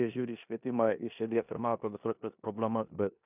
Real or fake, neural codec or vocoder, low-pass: fake; codec, 16 kHz in and 24 kHz out, 0.9 kbps, LongCat-Audio-Codec, four codebook decoder; 3.6 kHz